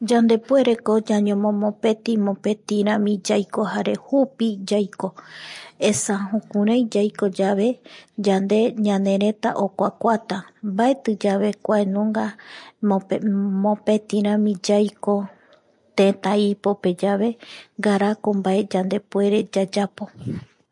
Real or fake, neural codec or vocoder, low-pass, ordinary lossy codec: real; none; 10.8 kHz; MP3, 48 kbps